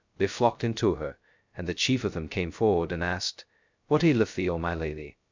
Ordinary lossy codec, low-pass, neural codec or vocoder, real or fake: MP3, 64 kbps; 7.2 kHz; codec, 16 kHz, 0.2 kbps, FocalCodec; fake